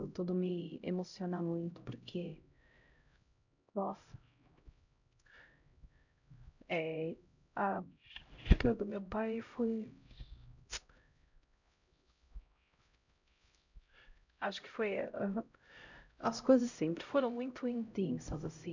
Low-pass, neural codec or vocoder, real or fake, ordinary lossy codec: 7.2 kHz; codec, 16 kHz, 0.5 kbps, X-Codec, HuBERT features, trained on LibriSpeech; fake; none